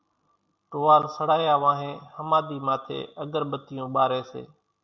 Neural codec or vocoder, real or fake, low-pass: none; real; 7.2 kHz